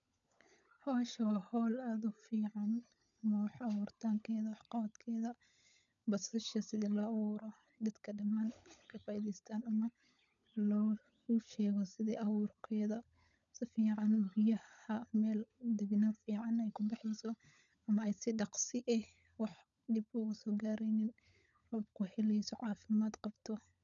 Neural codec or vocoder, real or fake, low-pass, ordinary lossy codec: codec, 16 kHz, 16 kbps, FunCodec, trained on LibriTTS, 50 frames a second; fake; 7.2 kHz; none